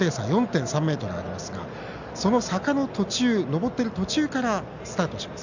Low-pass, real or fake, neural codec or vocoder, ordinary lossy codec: 7.2 kHz; real; none; none